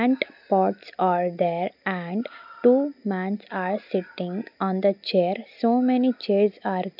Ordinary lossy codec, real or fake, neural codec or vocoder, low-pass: none; real; none; 5.4 kHz